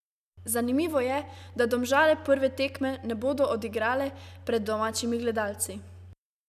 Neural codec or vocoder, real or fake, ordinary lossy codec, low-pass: none; real; none; 14.4 kHz